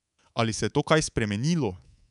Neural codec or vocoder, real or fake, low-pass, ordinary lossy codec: codec, 24 kHz, 3.1 kbps, DualCodec; fake; 10.8 kHz; none